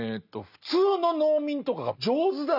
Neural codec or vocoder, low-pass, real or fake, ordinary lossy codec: none; 5.4 kHz; real; none